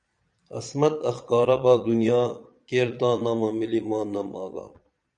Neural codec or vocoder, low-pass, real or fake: vocoder, 22.05 kHz, 80 mel bands, Vocos; 9.9 kHz; fake